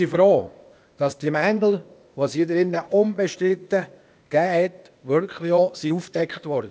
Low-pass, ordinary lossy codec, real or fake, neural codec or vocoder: none; none; fake; codec, 16 kHz, 0.8 kbps, ZipCodec